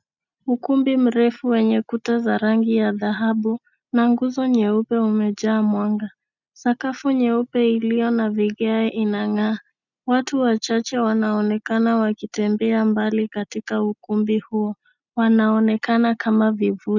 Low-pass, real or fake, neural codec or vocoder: 7.2 kHz; real; none